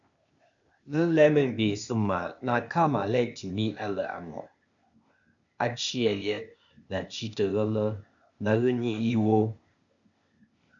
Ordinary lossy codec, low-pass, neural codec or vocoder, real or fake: MP3, 96 kbps; 7.2 kHz; codec, 16 kHz, 0.8 kbps, ZipCodec; fake